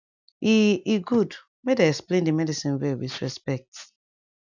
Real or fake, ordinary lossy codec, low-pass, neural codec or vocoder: real; none; 7.2 kHz; none